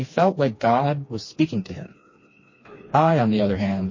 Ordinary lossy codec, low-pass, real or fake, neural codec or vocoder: MP3, 32 kbps; 7.2 kHz; fake; codec, 16 kHz, 2 kbps, FreqCodec, smaller model